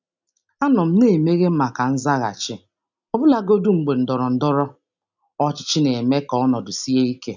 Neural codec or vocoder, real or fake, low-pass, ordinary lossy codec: none; real; 7.2 kHz; none